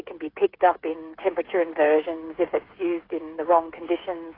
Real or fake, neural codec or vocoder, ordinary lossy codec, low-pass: fake; codec, 16 kHz, 8 kbps, FreqCodec, smaller model; AAC, 24 kbps; 5.4 kHz